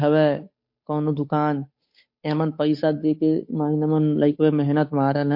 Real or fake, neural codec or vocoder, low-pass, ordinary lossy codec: fake; codec, 16 kHz, 8 kbps, FunCodec, trained on Chinese and English, 25 frames a second; 5.4 kHz; MP3, 32 kbps